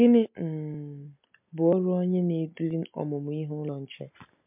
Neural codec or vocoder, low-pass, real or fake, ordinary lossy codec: none; 3.6 kHz; real; MP3, 32 kbps